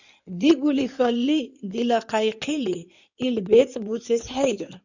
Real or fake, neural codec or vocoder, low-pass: fake; codec, 16 kHz in and 24 kHz out, 2.2 kbps, FireRedTTS-2 codec; 7.2 kHz